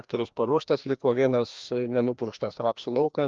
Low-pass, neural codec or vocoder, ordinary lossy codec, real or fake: 7.2 kHz; codec, 16 kHz, 1 kbps, FreqCodec, larger model; Opus, 24 kbps; fake